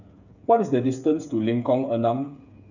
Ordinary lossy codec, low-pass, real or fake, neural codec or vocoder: none; 7.2 kHz; fake; codec, 16 kHz, 8 kbps, FreqCodec, smaller model